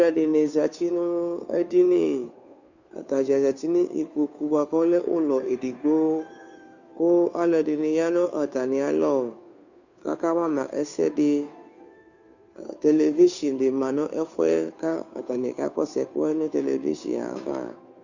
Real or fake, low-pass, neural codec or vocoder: fake; 7.2 kHz; codec, 16 kHz, 2 kbps, FunCodec, trained on Chinese and English, 25 frames a second